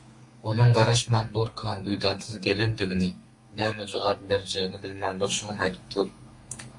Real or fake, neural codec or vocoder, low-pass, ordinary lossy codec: fake; codec, 32 kHz, 1.9 kbps, SNAC; 10.8 kHz; MP3, 48 kbps